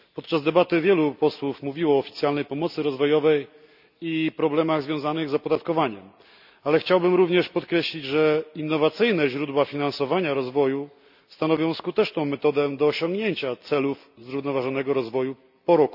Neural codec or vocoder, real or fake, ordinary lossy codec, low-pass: none; real; none; 5.4 kHz